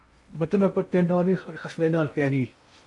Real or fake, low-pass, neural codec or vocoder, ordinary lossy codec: fake; 10.8 kHz; codec, 16 kHz in and 24 kHz out, 0.6 kbps, FocalCodec, streaming, 2048 codes; MP3, 64 kbps